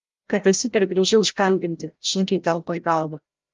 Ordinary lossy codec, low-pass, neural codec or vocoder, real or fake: Opus, 32 kbps; 7.2 kHz; codec, 16 kHz, 0.5 kbps, FreqCodec, larger model; fake